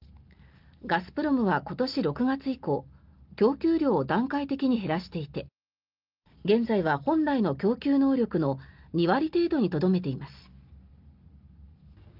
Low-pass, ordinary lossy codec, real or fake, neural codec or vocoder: 5.4 kHz; Opus, 32 kbps; real; none